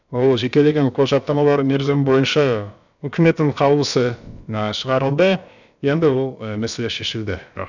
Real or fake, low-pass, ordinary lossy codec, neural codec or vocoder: fake; 7.2 kHz; none; codec, 16 kHz, about 1 kbps, DyCAST, with the encoder's durations